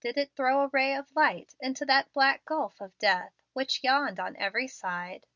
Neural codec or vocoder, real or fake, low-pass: none; real; 7.2 kHz